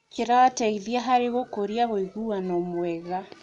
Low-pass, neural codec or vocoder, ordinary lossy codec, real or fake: 10.8 kHz; none; none; real